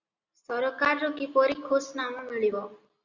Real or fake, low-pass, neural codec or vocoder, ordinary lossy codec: real; 7.2 kHz; none; Opus, 64 kbps